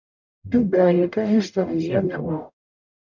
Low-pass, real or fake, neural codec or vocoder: 7.2 kHz; fake; codec, 44.1 kHz, 0.9 kbps, DAC